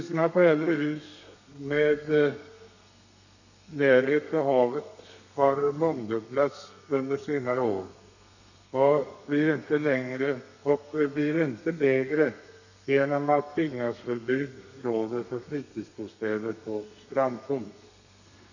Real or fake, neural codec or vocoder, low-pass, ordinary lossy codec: fake; codec, 32 kHz, 1.9 kbps, SNAC; 7.2 kHz; none